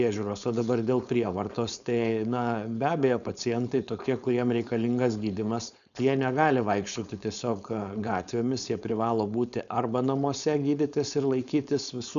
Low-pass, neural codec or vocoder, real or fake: 7.2 kHz; codec, 16 kHz, 4.8 kbps, FACodec; fake